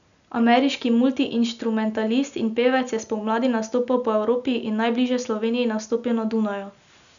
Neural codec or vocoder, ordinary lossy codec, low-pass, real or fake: none; none; 7.2 kHz; real